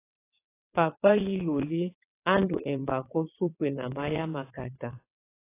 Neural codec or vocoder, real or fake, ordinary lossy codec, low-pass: vocoder, 22.05 kHz, 80 mel bands, WaveNeXt; fake; AAC, 24 kbps; 3.6 kHz